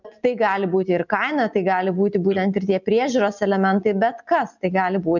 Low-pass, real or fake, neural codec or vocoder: 7.2 kHz; real; none